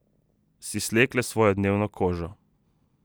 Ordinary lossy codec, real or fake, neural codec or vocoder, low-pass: none; real; none; none